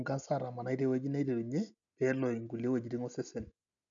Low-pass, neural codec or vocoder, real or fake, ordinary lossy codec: 7.2 kHz; none; real; none